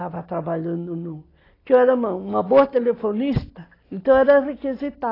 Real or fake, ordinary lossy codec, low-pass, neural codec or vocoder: real; AAC, 24 kbps; 5.4 kHz; none